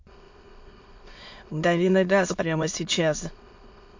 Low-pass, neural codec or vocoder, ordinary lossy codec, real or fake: 7.2 kHz; autoencoder, 22.05 kHz, a latent of 192 numbers a frame, VITS, trained on many speakers; MP3, 48 kbps; fake